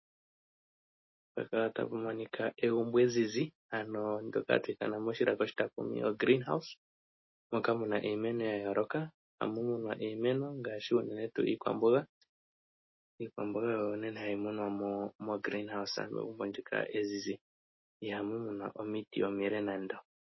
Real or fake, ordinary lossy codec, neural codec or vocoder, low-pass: real; MP3, 24 kbps; none; 7.2 kHz